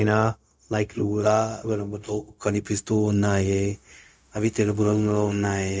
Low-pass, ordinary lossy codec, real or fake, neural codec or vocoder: none; none; fake; codec, 16 kHz, 0.4 kbps, LongCat-Audio-Codec